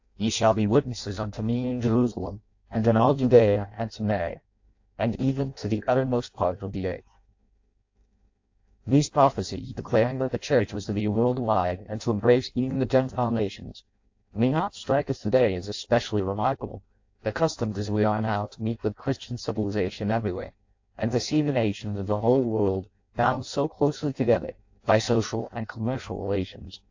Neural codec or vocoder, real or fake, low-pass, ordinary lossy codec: codec, 16 kHz in and 24 kHz out, 0.6 kbps, FireRedTTS-2 codec; fake; 7.2 kHz; AAC, 48 kbps